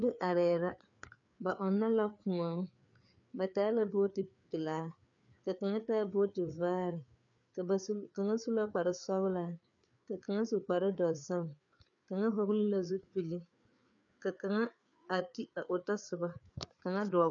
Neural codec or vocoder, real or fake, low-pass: codec, 16 kHz, 4 kbps, FreqCodec, larger model; fake; 7.2 kHz